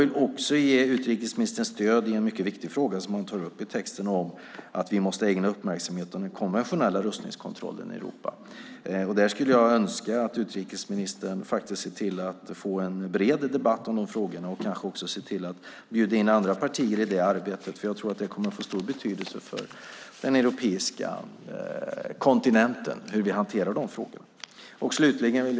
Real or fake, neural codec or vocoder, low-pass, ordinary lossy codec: real; none; none; none